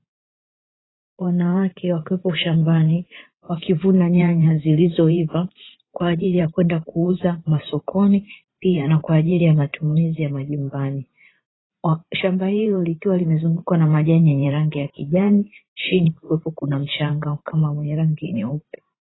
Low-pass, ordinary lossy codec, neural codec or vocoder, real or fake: 7.2 kHz; AAC, 16 kbps; vocoder, 44.1 kHz, 128 mel bands every 512 samples, BigVGAN v2; fake